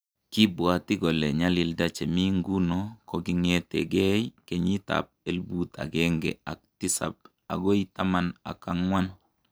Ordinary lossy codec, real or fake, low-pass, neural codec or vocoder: none; real; none; none